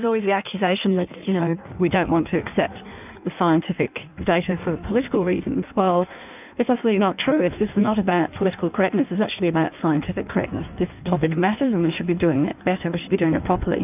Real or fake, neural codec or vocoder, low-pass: fake; codec, 16 kHz in and 24 kHz out, 1.1 kbps, FireRedTTS-2 codec; 3.6 kHz